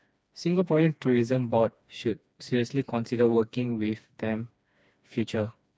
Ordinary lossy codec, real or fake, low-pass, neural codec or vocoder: none; fake; none; codec, 16 kHz, 2 kbps, FreqCodec, smaller model